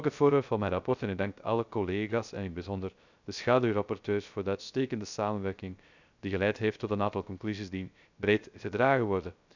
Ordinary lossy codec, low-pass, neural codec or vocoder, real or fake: none; 7.2 kHz; codec, 16 kHz, 0.3 kbps, FocalCodec; fake